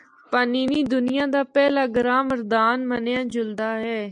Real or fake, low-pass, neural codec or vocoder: real; 10.8 kHz; none